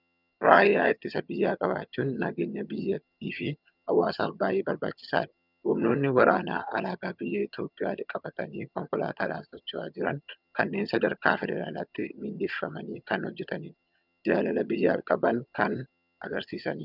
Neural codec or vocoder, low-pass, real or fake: vocoder, 22.05 kHz, 80 mel bands, HiFi-GAN; 5.4 kHz; fake